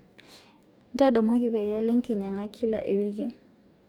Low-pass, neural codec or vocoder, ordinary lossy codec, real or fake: 19.8 kHz; codec, 44.1 kHz, 2.6 kbps, DAC; none; fake